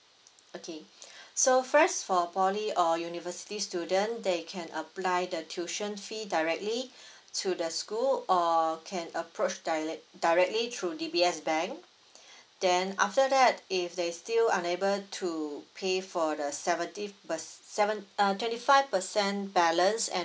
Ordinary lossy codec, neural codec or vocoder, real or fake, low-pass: none; none; real; none